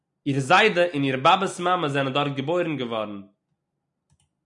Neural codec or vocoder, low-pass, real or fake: none; 10.8 kHz; real